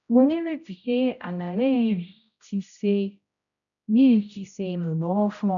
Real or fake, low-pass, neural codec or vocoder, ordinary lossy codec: fake; 7.2 kHz; codec, 16 kHz, 0.5 kbps, X-Codec, HuBERT features, trained on general audio; none